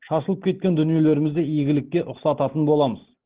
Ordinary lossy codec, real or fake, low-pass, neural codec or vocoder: Opus, 16 kbps; real; 3.6 kHz; none